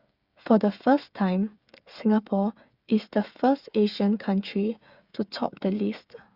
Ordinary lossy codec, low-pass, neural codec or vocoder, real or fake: Opus, 64 kbps; 5.4 kHz; codec, 16 kHz, 8 kbps, FreqCodec, smaller model; fake